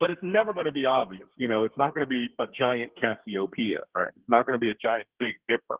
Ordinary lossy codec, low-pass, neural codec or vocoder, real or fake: Opus, 16 kbps; 3.6 kHz; codec, 32 kHz, 1.9 kbps, SNAC; fake